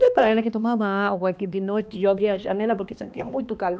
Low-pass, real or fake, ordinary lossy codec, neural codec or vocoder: none; fake; none; codec, 16 kHz, 2 kbps, X-Codec, HuBERT features, trained on balanced general audio